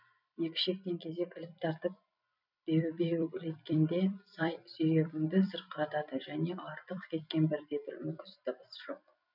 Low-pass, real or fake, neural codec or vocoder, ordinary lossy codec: 5.4 kHz; fake; vocoder, 44.1 kHz, 128 mel bands every 256 samples, BigVGAN v2; none